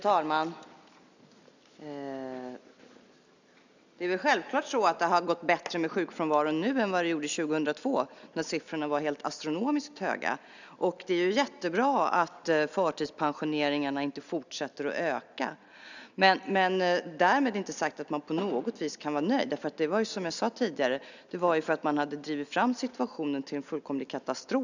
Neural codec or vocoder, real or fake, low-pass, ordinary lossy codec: none; real; 7.2 kHz; none